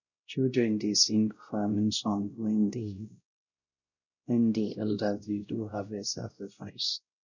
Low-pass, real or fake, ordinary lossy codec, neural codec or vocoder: 7.2 kHz; fake; none; codec, 16 kHz, 0.5 kbps, X-Codec, WavLM features, trained on Multilingual LibriSpeech